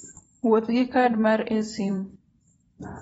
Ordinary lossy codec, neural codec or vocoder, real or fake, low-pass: AAC, 24 kbps; vocoder, 44.1 kHz, 128 mel bands every 512 samples, BigVGAN v2; fake; 19.8 kHz